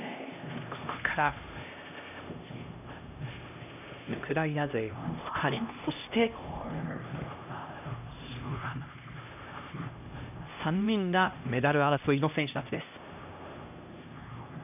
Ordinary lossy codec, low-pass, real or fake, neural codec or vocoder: none; 3.6 kHz; fake; codec, 16 kHz, 1 kbps, X-Codec, HuBERT features, trained on LibriSpeech